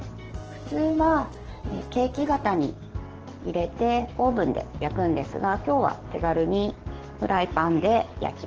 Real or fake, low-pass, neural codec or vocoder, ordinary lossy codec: fake; 7.2 kHz; codec, 44.1 kHz, 7.8 kbps, DAC; Opus, 16 kbps